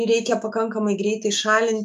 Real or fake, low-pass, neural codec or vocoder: fake; 14.4 kHz; autoencoder, 48 kHz, 128 numbers a frame, DAC-VAE, trained on Japanese speech